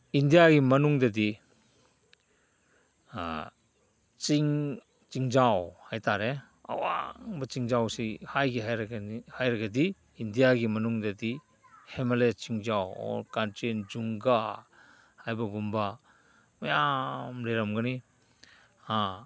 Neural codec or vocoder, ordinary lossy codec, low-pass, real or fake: none; none; none; real